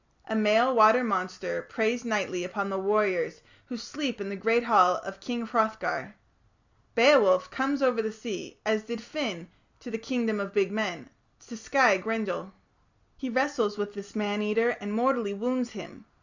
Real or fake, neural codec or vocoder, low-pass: real; none; 7.2 kHz